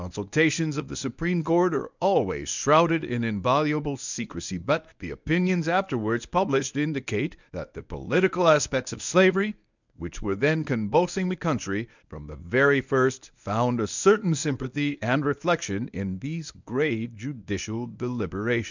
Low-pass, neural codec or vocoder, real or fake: 7.2 kHz; codec, 24 kHz, 0.9 kbps, WavTokenizer, medium speech release version 1; fake